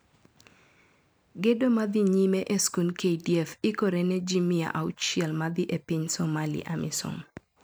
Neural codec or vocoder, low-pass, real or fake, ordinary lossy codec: none; none; real; none